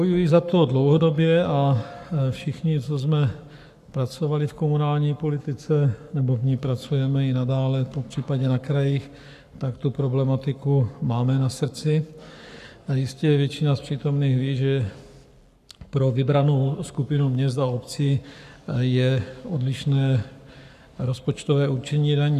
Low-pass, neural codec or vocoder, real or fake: 14.4 kHz; codec, 44.1 kHz, 7.8 kbps, Pupu-Codec; fake